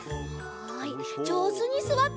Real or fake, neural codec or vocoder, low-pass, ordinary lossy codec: real; none; none; none